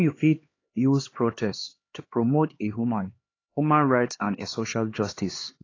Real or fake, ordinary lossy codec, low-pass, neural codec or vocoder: fake; AAC, 32 kbps; 7.2 kHz; codec, 16 kHz, 2 kbps, X-Codec, HuBERT features, trained on LibriSpeech